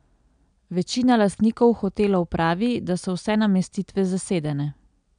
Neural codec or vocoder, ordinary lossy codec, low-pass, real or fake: none; none; 9.9 kHz; real